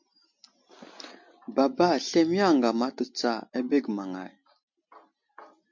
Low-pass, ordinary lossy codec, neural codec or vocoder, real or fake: 7.2 kHz; MP3, 48 kbps; none; real